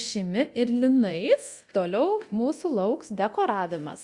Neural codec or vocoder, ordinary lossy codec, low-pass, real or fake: codec, 24 kHz, 0.9 kbps, DualCodec; Opus, 64 kbps; 10.8 kHz; fake